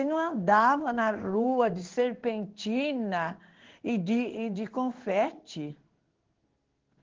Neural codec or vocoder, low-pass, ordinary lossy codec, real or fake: codec, 16 kHz in and 24 kHz out, 1 kbps, XY-Tokenizer; 7.2 kHz; Opus, 16 kbps; fake